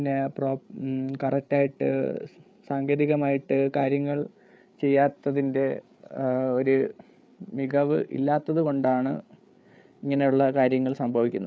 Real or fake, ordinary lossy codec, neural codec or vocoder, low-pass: fake; none; codec, 16 kHz, 8 kbps, FreqCodec, larger model; none